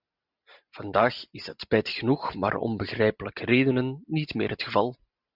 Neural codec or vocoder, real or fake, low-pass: none; real; 5.4 kHz